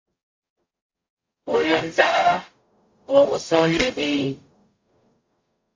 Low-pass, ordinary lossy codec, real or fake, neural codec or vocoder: 7.2 kHz; MP3, 48 kbps; fake; codec, 44.1 kHz, 0.9 kbps, DAC